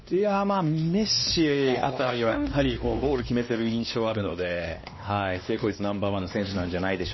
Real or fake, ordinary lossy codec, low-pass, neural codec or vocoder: fake; MP3, 24 kbps; 7.2 kHz; codec, 16 kHz, 2 kbps, X-Codec, WavLM features, trained on Multilingual LibriSpeech